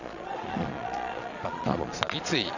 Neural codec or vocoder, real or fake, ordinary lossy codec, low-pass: vocoder, 22.05 kHz, 80 mel bands, Vocos; fake; none; 7.2 kHz